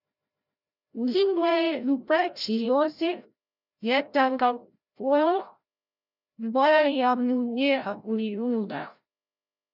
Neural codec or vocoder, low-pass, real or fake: codec, 16 kHz, 0.5 kbps, FreqCodec, larger model; 5.4 kHz; fake